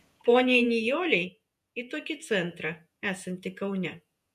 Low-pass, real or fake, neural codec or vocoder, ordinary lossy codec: 14.4 kHz; fake; vocoder, 48 kHz, 128 mel bands, Vocos; MP3, 96 kbps